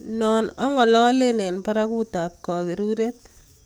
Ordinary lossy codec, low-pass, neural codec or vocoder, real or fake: none; none; codec, 44.1 kHz, 7.8 kbps, DAC; fake